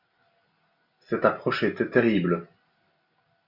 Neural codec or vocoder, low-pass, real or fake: none; 5.4 kHz; real